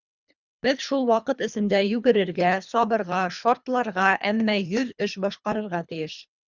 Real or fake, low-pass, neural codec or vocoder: fake; 7.2 kHz; codec, 24 kHz, 3 kbps, HILCodec